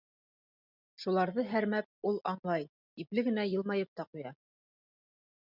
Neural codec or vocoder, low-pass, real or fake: none; 5.4 kHz; real